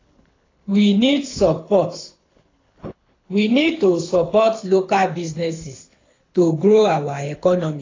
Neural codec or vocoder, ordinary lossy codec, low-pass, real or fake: codec, 24 kHz, 6 kbps, HILCodec; AAC, 32 kbps; 7.2 kHz; fake